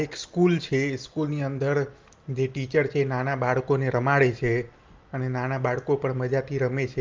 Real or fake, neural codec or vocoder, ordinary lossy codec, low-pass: real; none; Opus, 32 kbps; 7.2 kHz